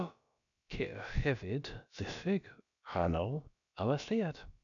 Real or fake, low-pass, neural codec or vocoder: fake; 7.2 kHz; codec, 16 kHz, about 1 kbps, DyCAST, with the encoder's durations